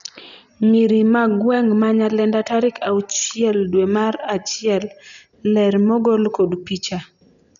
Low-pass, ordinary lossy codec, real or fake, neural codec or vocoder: 7.2 kHz; none; real; none